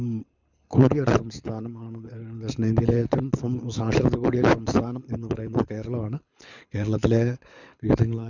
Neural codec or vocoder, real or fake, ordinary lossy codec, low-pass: codec, 24 kHz, 6 kbps, HILCodec; fake; none; 7.2 kHz